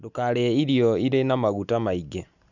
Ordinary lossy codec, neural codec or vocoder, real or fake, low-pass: none; none; real; 7.2 kHz